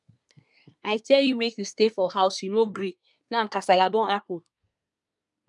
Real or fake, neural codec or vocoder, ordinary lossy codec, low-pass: fake; codec, 24 kHz, 1 kbps, SNAC; none; 10.8 kHz